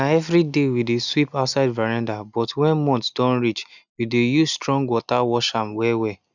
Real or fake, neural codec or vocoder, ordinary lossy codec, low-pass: real; none; none; 7.2 kHz